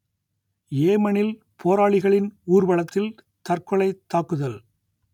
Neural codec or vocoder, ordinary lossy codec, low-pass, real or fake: none; none; 19.8 kHz; real